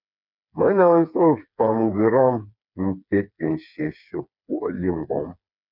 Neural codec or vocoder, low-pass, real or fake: codec, 16 kHz, 4 kbps, FreqCodec, smaller model; 5.4 kHz; fake